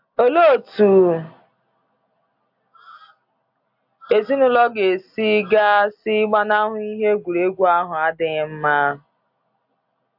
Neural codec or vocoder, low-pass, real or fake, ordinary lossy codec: none; 5.4 kHz; real; AAC, 48 kbps